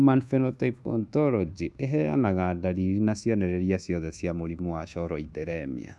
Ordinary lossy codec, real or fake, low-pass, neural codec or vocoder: none; fake; none; codec, 24 kHz, 1.2 kbps, DualCodec